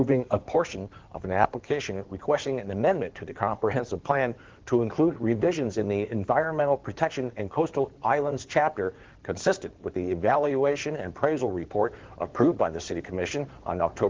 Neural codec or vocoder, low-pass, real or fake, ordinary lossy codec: codec, 16 kHz in and 24 kHz out, 2.2 kbps, FireRedTTS-2 codec; 7.2 kHz; fake; Opus, 16 kbps